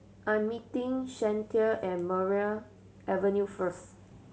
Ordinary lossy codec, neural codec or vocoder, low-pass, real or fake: none; none; none; real